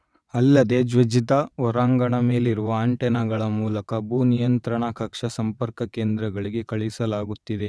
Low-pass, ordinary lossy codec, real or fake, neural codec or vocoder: none; none; fake; vocoder, 22.05 kHz, 80 mel bands, WaveNeXt